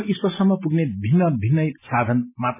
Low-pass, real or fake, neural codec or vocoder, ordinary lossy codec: 3.6 kHz; real; none; MP3, 16 kbps